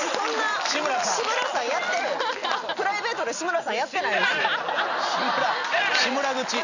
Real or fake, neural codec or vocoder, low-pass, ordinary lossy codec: real; none; 7.2 kHz; none